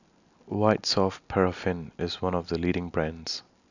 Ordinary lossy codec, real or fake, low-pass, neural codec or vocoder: none; real; 7.2 kHz; none